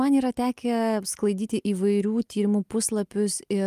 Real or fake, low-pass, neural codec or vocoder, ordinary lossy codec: real; 14.4 kHz; none; Opus, 32 kbps